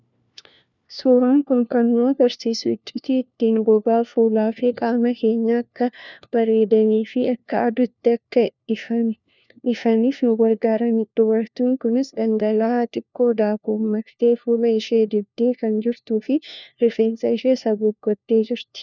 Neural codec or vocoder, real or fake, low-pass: codec, 16 kHz, 1 kbps, FunCodec, trained on LibriTTS, 50 frames a second; fake; 7.2 kHz